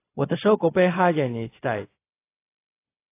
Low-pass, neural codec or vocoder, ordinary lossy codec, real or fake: 3.6 kHz; codec, 16 kHz, 0.4 kbps, LongCat-Audio-Codec; AAC, 24 kbps; fake